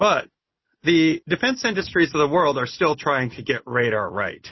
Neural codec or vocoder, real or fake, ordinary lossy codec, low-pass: none; real; MP3, 24 kbps; 7.2 kHz